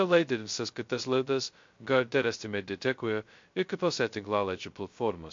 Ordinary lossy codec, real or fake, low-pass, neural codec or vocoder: MP3, 48 kbps; fake; 7.2 kHz; codec, 16 kHz, 0.2 kbps, FocalCodec